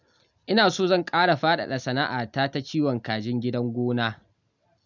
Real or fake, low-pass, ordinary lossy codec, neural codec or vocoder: real; 7.2 kHz; none; none